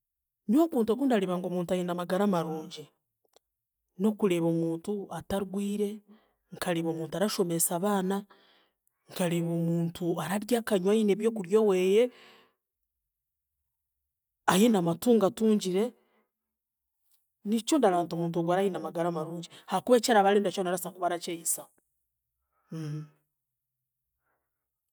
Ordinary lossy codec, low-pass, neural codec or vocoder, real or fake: none; none; none; real